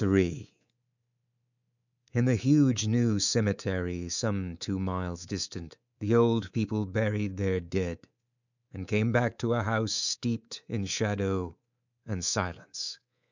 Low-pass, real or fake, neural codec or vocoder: 7.2 kHz; fake; codec, 24 kHz, 3.1 kbps, DualCodec